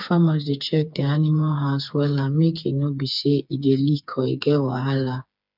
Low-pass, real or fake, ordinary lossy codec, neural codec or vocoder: 5.4 kHz; fake; none; codec, 16 kHz, 4 kbps, FreqCodec, smaller model